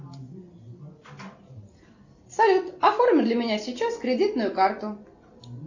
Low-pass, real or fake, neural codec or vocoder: 7.2 kHz; real; none